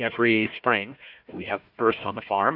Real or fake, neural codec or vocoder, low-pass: fake; codec, 16 kHz, 1 kbps, FunCodec, trained on Chinese and English, 50 frames a second; 5.4 kHz